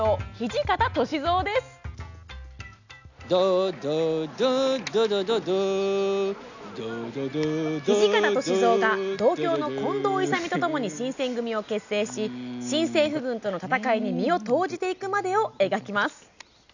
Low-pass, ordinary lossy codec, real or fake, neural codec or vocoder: 7.2 kHz; none; real; none